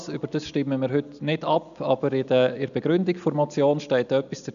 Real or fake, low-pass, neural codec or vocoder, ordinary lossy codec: real; 7.2 kHz; none; none